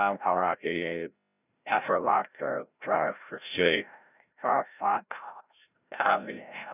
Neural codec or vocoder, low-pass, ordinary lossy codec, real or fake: codec, 16 kHz, 0.5 kbps, FreqCodec, larger model; 3.6 kHz; none; fake